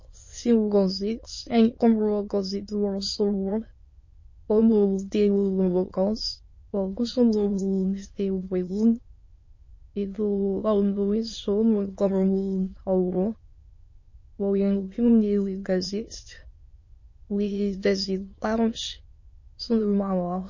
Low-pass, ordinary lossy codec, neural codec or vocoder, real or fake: 7.2 kHz; MP3, 32 kbps; autoencoder, 22.05 kHz, a latent of 192 numbers a frame, VITS, trained on many speakers; fake